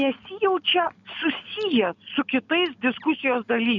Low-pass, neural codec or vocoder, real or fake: 7.2 kHz; none; real